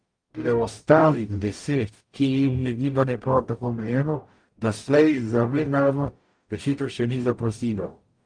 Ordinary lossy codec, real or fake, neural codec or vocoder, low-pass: Opus, 32 kbps; fake; codec, 44.1 kHz, 0.9 kbps, DAC; 9.9 kHz